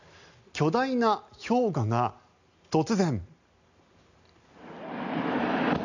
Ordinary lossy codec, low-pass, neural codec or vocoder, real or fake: none; 7.2 kHz; none; real